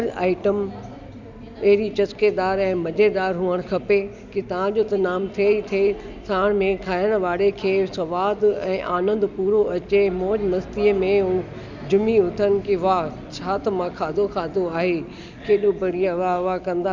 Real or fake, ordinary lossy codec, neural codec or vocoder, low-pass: real; none; none; 7.2 kHz